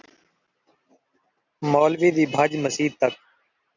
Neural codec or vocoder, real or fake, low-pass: none; real; 7.2 kHz